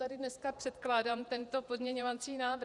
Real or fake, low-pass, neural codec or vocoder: fake; 10.8 kHz; vocoder, 48 kHz, 128 mel bands, Vocos